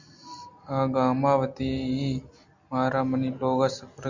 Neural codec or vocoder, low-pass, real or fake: none; 7.2 kHz; real